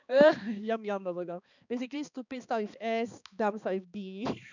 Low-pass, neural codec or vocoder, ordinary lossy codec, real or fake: 7.2 kHz; codec, 16 kHz, 2 kbps, X-Codec, HuBERT features, trained on balanced general audio; none; fake